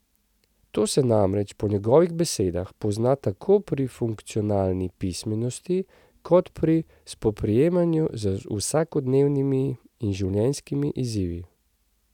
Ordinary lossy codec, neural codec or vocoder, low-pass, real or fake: none; none; 19.8 kHz; real